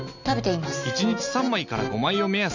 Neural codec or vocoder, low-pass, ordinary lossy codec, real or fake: none; 7.2 kHz; none; real